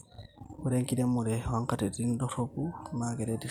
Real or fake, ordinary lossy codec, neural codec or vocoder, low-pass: fake; none; vocoder, 44.1 kHz, 128 mel bands every 256 samples, BigVGAN v2; 19.8 kHz